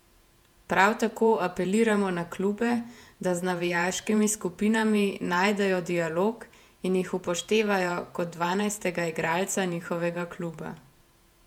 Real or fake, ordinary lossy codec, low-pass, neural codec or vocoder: fake; MP3, 96 kbps; 19.8 kHz; vocoder, 48 kHz, 128 mel bands, Vocos